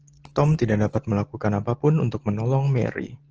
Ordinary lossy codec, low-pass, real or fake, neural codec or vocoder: Opus, 16 kbps; 7.2 kHz; real; none